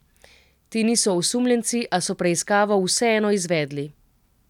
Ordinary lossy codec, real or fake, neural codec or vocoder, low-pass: none; real; none; 19.8 kHz